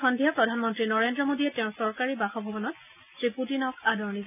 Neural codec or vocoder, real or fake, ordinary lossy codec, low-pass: none; real; none; 3.6 kHz